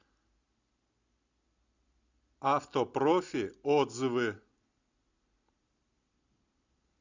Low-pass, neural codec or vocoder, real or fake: 7.2 kHz; none; real